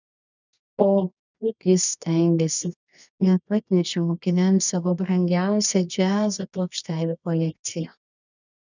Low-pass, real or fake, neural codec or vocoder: 7.2 kHz; fake; codec, 24 kHz, 0.9 kbps, WavTokenizer, medium music audio release